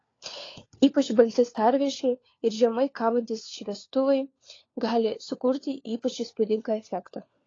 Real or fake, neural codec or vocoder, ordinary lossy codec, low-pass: fake; codec, 16 kHz, 4 kbps, FunCodec, trained on LibriTTS, 50 frames a second; AAC, 32 kbps; 7.2 kHz